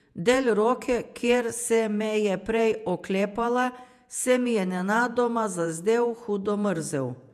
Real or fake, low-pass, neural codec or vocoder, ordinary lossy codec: fake; 14.4 kHz; vocoder, 48 kHz, 128 mel bands, Vocos; MP3, 96 kbps